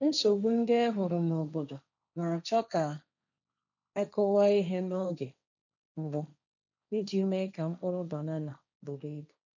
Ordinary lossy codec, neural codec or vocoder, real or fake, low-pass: none; codec, 16 kHz, 1.1 kbps, Voila-Tokenizer; fake; 7.2 kHz